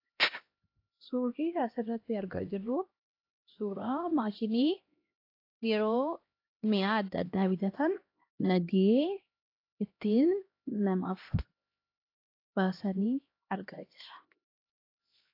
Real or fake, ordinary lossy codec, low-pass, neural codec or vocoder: fake; AAC, 32 kbps; 5.4 kHz; codec, 16 kHz, 1 kbps, X-Codec, HuBERT features, trained on LibriSpeech